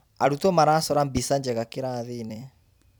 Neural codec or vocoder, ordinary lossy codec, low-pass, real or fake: vocoder, 44.1 kHz, 128 mel bands every 256 samples, BigVGAN v2; none; none; fake